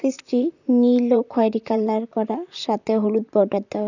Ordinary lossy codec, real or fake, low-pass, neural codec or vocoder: none; fake; 7.2 kHz; vocoder, 44.1 kHz, 128 mel bands, Pupu-Vocoder